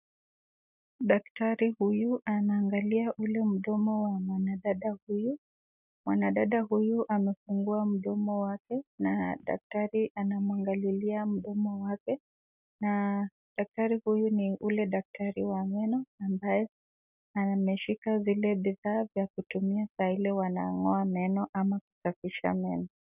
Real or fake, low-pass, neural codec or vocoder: real; 3.6 kHz; none